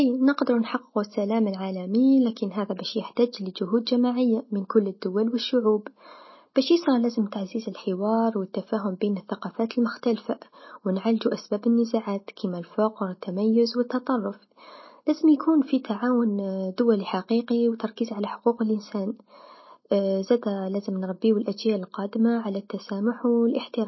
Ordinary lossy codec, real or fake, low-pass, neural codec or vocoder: MP3, 24 kbps; real; 7.2 kHz; none